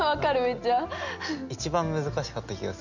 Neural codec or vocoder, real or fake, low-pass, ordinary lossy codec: none; real; 7.2 kHz; none